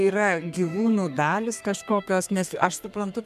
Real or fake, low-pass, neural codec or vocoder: fake; 14.4 kHz; codec, 32 kHz, 1.9 kbps, SNAC